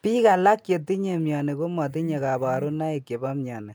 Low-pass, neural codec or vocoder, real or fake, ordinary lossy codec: none; none; real; none